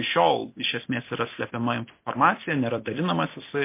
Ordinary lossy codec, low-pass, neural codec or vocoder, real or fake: MP3, 24 kbps; 3.6 kHz; none; real